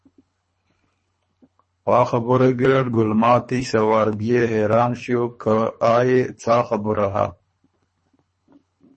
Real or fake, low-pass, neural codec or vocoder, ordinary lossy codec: fake; 10.8 kHz; codec, 24 kHz, 3 kbps, HILCodec; MP3, 32 kbps